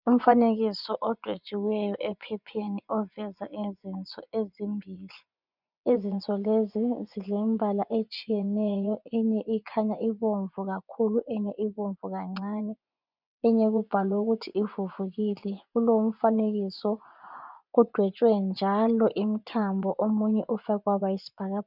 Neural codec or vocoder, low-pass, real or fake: vocoder, 24 kHz, 100 mel bands, Vocos; 5.4 kHz; fake